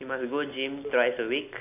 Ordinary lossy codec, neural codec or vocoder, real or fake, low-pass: none; none; real; 3.6 kHz